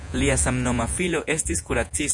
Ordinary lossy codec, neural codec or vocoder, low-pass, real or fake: MP3, 96 kbps; vocoder, 48 kHz, 128 mel bands, Vocos; 10.8 kHz; fake